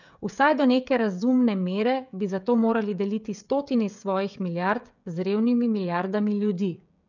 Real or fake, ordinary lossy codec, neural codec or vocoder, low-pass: fake; none; codec, 16 kHz, 16 kbps, FreqCodec, smaller model; 7.2 kHz